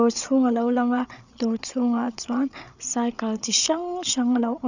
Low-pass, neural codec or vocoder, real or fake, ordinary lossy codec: 7.2 kHz; codec, 16 kHz, 8 kbps, FreqCodec, larger model; fake; none